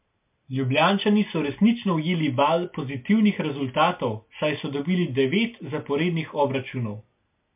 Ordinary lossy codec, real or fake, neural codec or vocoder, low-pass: MP3, 32 kbps; real; none; 3.6 kHz